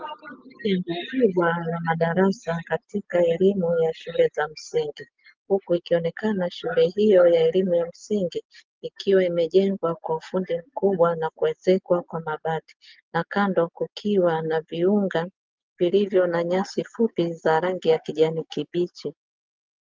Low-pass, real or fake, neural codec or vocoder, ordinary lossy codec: 7.2 kHz; real; none; Opus, 24 kbps